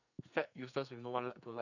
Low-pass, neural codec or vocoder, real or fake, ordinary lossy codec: 7.2 kHz; codec, 44.1 kHz, 2.6 kbps, SNAC; fake; none